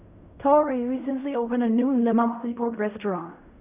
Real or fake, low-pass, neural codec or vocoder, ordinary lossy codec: fake; 3.6 kHz; codec, 16 kHz in and 24 kHz out, 0.4 kbps, LongCat-Audio-Codec, fine tuned four codebook decoder; none